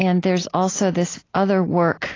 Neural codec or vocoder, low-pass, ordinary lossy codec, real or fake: none; 7.2 kHz; AAC, 32 kbps; real